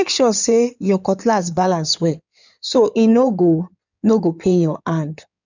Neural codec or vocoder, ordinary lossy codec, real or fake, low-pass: codec, 16 kHz in and 24 kHz out, 2.2 kbps, FireRedTTS-2 codec; none; fake; 7.2 kHz